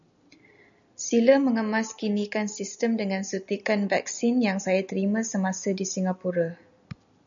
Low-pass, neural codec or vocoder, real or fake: 7.2 kHz; none; real